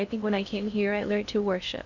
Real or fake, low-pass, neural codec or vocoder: fake; 7.2 kHz; codec, 16 kHz in and 24 kHz out, 0.6 kbps, FocalCodec, streaming, 2048 codes